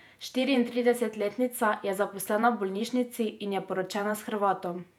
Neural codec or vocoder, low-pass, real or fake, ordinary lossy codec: vocoder, 48 kHz, 128 mel bands, Vocos; 19.8 kHz; fake; none